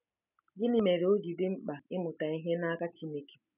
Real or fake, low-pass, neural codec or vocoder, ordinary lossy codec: real; 3.6 kHz; none; none